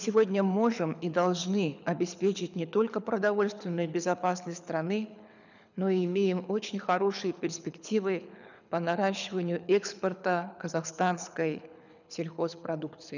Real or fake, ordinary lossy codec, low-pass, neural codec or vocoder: fake; none; 7.2 kHz; codec, 24 kHz, 6 kbps, HILCodec